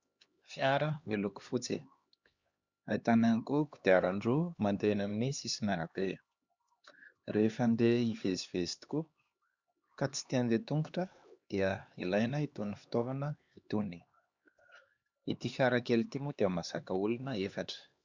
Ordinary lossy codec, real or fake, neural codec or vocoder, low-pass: Opus, 64 kbps; fake; codec, 16 kHz, 2 kbps, X-Codec, HuBERT features, trained on LibriSpeech; 7.2 kHz